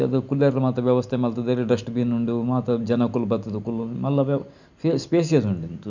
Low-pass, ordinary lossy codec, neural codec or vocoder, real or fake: 7.2 kHz; none; none; real